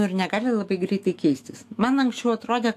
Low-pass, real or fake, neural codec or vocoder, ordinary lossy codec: 14.4 kHz; fake; autoencoder, 48 kHz, 128 numbers a frame, DAC-VAE, trained on Japanese speech; AAC, 64 kbps